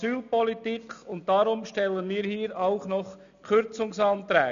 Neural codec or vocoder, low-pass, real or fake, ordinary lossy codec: none; 7.2 kHz; real; none